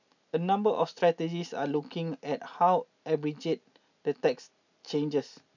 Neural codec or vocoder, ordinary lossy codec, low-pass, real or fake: none; none; 7.2 kHz; real